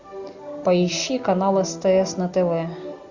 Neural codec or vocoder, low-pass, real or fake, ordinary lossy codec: none; 7.2 kHz; real; Opus, 64 kbps